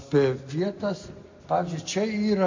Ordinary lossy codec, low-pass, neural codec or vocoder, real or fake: MP3, 64 kbps; 7.2 kHz; none; real